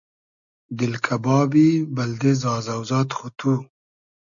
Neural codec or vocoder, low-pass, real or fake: none; 7.2 kHz; real